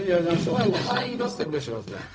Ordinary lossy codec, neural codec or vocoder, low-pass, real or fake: none; codec, 16 kHz, 0.4 kbps, LongCat-Audio-Codec; none; fake